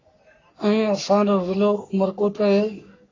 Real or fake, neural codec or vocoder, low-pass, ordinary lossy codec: fake; codec, 44.1 kHz, 3.4 kbps, Pupu-Codec; 7.2 kHz; AAC, 32 kbps